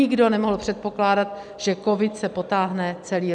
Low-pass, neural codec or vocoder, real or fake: 9.9 kHz; none; real